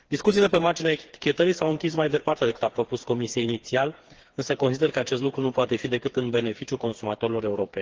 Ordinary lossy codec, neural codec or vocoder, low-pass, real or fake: Opus, 16 kbps; codec, 16 kHz, 4 kbps, FreqCodec, smaller model; 7.2 kHz; fake